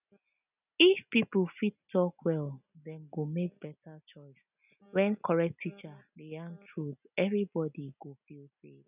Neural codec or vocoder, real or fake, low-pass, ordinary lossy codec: none; real; 3.6 kHz; none